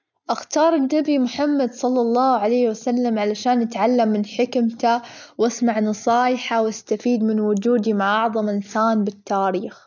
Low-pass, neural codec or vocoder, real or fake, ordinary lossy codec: 7.2 kHz; none; real; none